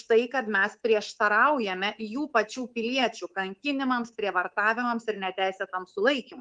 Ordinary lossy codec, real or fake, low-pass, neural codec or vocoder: Opus, 24 kbps; fake; 9.9 kHz; codec, 24 kHz, 3.1 kbps, DualCodec